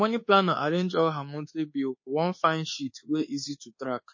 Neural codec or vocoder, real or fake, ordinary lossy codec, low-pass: codec, 24 kHz, 1.2 kbps, DualCodec; fake; MP3, 32 kbps; 7.2 kHz